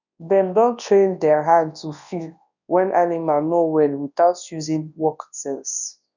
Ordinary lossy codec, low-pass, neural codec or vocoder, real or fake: none; 7.2 kHz; codec, 24 kHz, 0.9 kbps, WavTokenizer, large speech release; fake